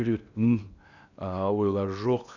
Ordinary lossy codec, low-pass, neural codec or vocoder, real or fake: none; 7.2 kHz; codec, 16 kHz in and 24 kHz out, 0.8 kbps, FocalCodec, streaming, 65536 codes; fake